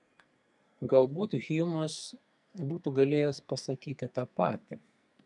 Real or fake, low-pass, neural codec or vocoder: fake; 10.8 kHz; codec, 44.1 kHz, 2.6 kbps, SNAC